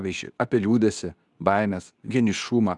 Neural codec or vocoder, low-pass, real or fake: codec, 24 kHz, 0.9 kbps, WavTokenizer, medium speech release version 2; 10.8 kHz; fake